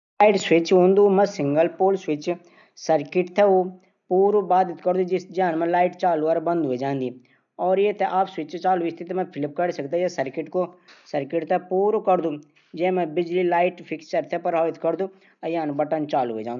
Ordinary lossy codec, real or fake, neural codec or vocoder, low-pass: none; real; none; 7.2 kHz